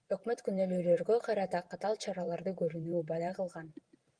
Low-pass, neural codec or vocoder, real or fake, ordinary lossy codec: 9.9 kHz; vocoder, 44.1 kHz, 128 mel bands every 512 samples, BigVGAN v2; fake; Opus, 24 kbps